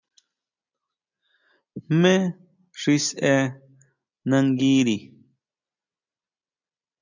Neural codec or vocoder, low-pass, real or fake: none; 7.2 kHz; real